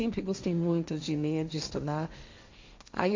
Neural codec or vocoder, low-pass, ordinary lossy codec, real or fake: codec, 16 kHz, 1.1 kbps, Voila-Tokenizer; 7.2 kHz; MP3, 64 kbps; fake